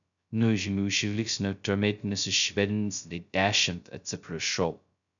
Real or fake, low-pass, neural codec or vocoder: fake; 7.2 kHz; codec, 16 kHz, 0.2 kbps, FocalCodec